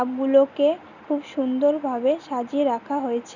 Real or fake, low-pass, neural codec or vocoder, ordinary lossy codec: real; 7.2 kHz; none; none